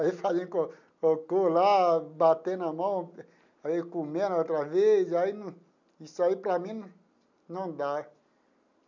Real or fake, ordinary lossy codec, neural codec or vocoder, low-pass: real; none; none; 7.2 kHz